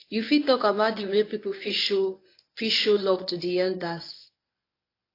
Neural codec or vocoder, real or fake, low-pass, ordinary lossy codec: codec, 24 kHz, 0.9 kbps, WavTokenizer, medium speech release version 1; fake; 5.4 kHz; AAC, 32 kbps